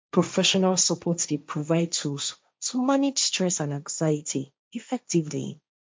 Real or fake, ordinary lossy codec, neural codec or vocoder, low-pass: fake; none; codec, 16 kHz, 1.1 kbps, Voila-Tokenizer; none